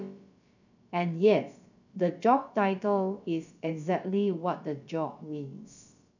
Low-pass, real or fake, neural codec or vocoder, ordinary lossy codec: 7.2 kHz; fake; codec, 16 kHz, about 1 kbps, DyCAST, with the encoder's durations; none